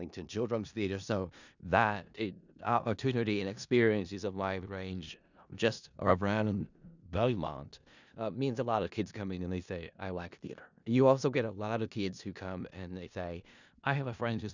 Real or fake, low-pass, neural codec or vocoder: fake; 7.2 kHz; codec, 16 kHz in and 24 kHz out, 0.4 kbps, LongCat-Audio-Codec, four codebook decoder